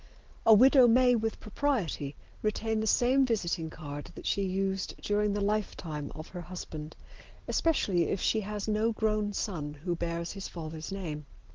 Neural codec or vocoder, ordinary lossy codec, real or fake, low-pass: none; Opus, 16 kbps; real; 7.2 kHz